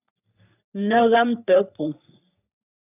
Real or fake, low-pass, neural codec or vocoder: fake; 3.6 kHz; codec, 44.1 kHz, 3.4 kbps, Pupu-Codec